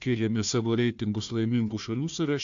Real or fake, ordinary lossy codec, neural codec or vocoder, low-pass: fake; AAC, 64 kbps; codec, 16 kHz, 1 kbps, FunCodec, trained on Chinese and English, 50 frames a second; 7.2 kHz